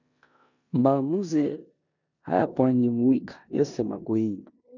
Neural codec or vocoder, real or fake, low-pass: codec, 16 kHz in and 24 kHz out, 0.9 kbps, LongCat-Audio-Codec, four codebook decoder; fake; 7.2 kHz